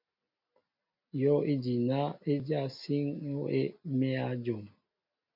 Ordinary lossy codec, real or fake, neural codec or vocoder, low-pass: MP3, 48 kbps; real; none; 5.4 kHz